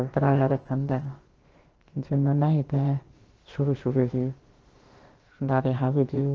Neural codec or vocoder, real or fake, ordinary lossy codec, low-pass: codec, 16 kHz, about 1 kbps, DyCAST, with the encoder's durations; fake; Opus, 16 kbps; 7.2 kHz